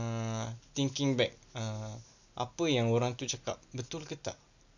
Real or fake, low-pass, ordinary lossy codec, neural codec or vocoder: real; 7.2 kHz; none; none